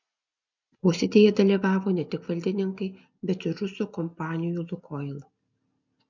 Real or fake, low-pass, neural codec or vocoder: real; 7.2 kHz; none